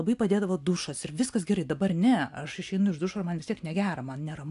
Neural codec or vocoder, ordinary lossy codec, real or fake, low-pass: none; AAC, 64 kbps; real; 10.8 kHz